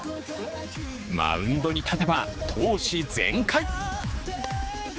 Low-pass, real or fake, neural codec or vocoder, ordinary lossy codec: none; fake; codec, 16 kHz, 4 kbps, X-Codec, HuBERT features, trained on general audio; none